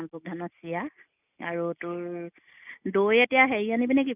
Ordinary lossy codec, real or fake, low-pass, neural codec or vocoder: none; real; 3.6 kHz; none